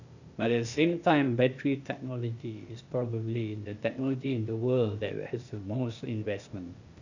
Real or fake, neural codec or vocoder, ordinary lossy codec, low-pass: fake; codec, 16 kHz, 0.8 kbps, ZipCodec; none; 7.2 kHz